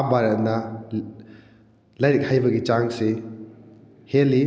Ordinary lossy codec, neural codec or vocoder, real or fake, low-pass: none; none; real; none